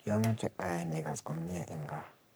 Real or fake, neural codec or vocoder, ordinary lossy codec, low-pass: fake; codec, 44.1 kHz, 3.4 kbps, Pupu-Codec; none; none